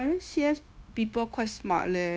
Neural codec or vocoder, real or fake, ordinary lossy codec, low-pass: codec, 16 kHz, 0.9 kbps, LongCat-Audio-Codec; fake; none; none